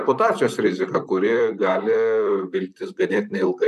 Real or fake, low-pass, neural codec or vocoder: fake; 14.4 kHz; vocoder, 44.1 kHz, 128 mel bands, Pupu-Vocoder